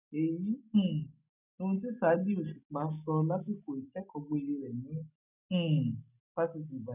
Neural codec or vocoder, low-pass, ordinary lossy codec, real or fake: none; 3.6 kHz; none; real